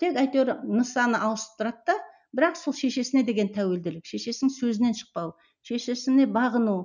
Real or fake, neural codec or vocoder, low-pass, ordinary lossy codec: real; none; 7.2 kHz; none